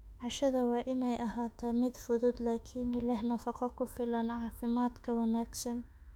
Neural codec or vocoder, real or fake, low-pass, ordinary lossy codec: autoencoder, 48 kHz, 32 numbers a frame, DAC-VAE, trained on Japanese speech; fake; 19.8 kHz; none